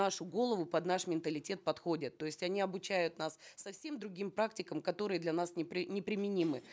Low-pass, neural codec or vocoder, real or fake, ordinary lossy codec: none; none; real; none